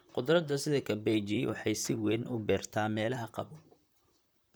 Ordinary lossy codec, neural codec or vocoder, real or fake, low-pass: none; vocoder, 44.1 kHz, 128 mel bands, Pupu-Vocoder; fake; none